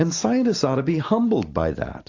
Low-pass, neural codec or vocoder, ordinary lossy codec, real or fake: 7.2 kHz; none; AAC, 48 kbps; real